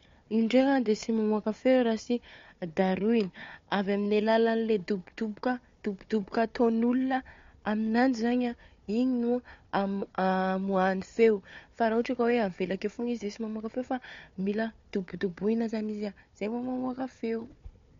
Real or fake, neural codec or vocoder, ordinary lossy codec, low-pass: fake; codec, 16 kHz, 4 kbps, FunCodec, trained on Chinese and English, 50 frames a second; MP3, 48 kbps; 7.2 kHz